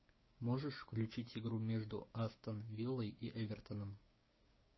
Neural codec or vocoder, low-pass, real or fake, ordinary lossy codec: codec, 16 kHz, 6 kbps, DAC; 7.2 kHz; fake; MP3, 24 kbps